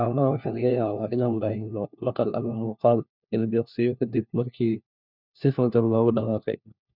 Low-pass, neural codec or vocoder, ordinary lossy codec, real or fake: 5.4 kHz; codec, 16 kHz, 1 kbps, FunCodec, trained on LibriTTS, 50 frames a second; none; fake